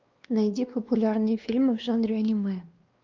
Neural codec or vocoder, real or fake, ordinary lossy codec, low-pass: codec, 16 kHz, 2 kbps, X-Codec, WavLM features, trained on Multilingual LibriSpeech; fake; Opus, 24 kbps; 7.2 kHz